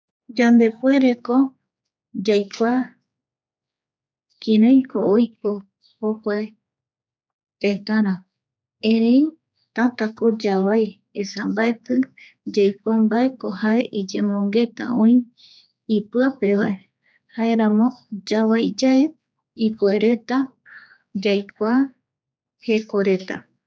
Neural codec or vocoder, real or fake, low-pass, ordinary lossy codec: codec, 16 kHz, 4 kbps, X-Codec, HuBERT features, trained on general audio; fake; none; none